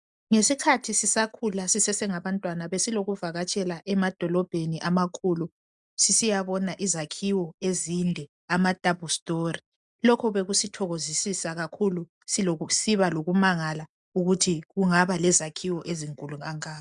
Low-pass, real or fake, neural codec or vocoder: 10.8 kHz; real; none